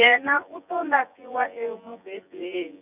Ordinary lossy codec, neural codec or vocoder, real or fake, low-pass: none; vocoder, 24 kHz, 100 mel bands, Vocos; fake; 3.6 kHz